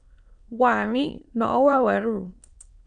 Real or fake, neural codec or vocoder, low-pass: fake; autoencoder, 22.05 kHz, a latent of 192 numbers a frame, VITS, trained on many speakers; 9.9 kHz